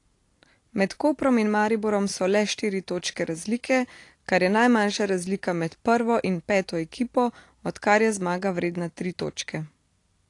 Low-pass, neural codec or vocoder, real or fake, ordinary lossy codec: 10.8 kHz; none; real; AAC, 48 kbps